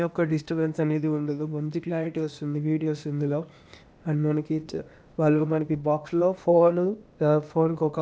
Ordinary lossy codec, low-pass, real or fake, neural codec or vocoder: none; none; fake; codec, 16 kHz, 0.8 kbps, ZipCodec